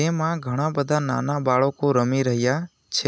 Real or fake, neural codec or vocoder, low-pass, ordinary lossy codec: real; none; none; none